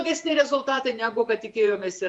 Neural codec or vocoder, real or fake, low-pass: vocoder, 48 kHz, 128 mel bands, Vocos; fake; 10.8 kHz